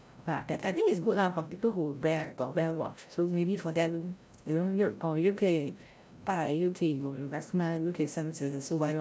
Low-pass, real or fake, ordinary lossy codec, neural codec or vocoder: none; fake; none; codec, 16 kHz, 0.5 kbps, FreqCodec, larger model